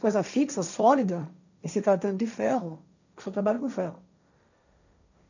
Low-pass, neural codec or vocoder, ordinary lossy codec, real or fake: 7.2 kHz; codec, 16 kHz, 1.1 kbps, Voila-Tokenizer; none; fake